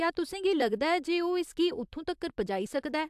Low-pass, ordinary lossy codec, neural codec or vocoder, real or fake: 14.4 kHz; AAC, 96 kbps; vocoder, 44.1 kHz, 128 mel bands, Pupu-Vocoder; fake